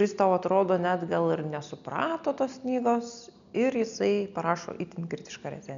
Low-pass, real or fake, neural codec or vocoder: 7.2 kHz; real; none